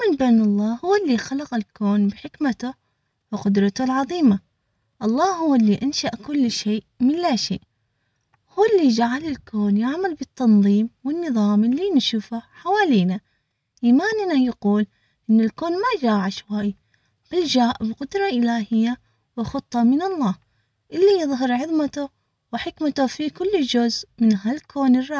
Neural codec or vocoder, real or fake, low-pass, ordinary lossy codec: none; real; none; none